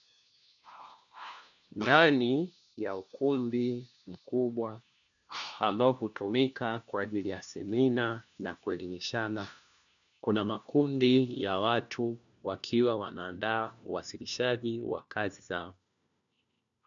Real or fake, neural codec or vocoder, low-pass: fake; codec, 16 kHz, 1 kbps, FunCodec, trained on LibriTTS, 50 frames a second; 7.2 kHz